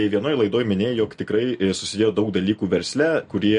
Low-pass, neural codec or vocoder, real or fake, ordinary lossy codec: 10.8 kHz; none; real; MP3, 48 kbps